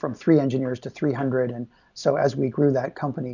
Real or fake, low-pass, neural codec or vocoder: real; 7.2 kHz; none